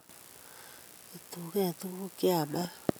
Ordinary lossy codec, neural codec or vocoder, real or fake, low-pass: none; none; real; none